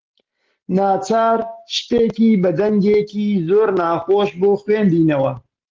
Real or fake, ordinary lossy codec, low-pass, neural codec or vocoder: real; Opus, 16 kbps; 7.2 kHz; none